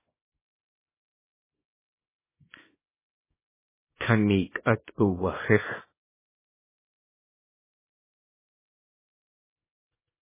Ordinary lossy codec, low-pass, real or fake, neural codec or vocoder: MP3, 16 kbps; 3.6 kHz; fake; codec, 24 kHz, 0.9 kbps, WavTokenizer, small release